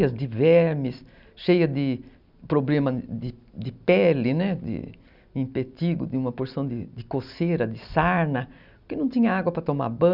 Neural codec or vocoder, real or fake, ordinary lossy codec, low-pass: none; real; none; 5.4 kHz